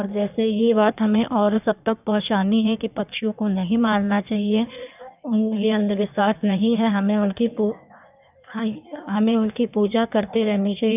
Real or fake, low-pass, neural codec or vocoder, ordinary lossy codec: fake; 3.6 kHz; codec, 16 kHz in and 24 kHz out, 1.1 kbps, FireRedTTS-2 codec; none